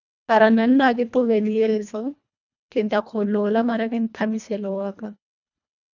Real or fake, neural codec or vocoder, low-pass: fake; codec, 24 kHz, 1.5 kbps, HILCodec; 7.2 kHz